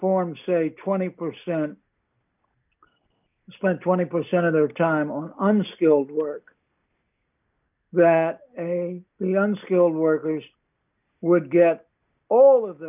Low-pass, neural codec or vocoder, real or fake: 3.6 kHz; none; real